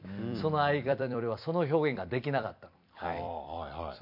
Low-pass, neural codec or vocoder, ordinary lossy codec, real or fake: 5.4 kHz; vocoder, 44.1 kHz, 128 mel bands every 256 samples, BigVGAN v2; MP3, 48 kbps; fake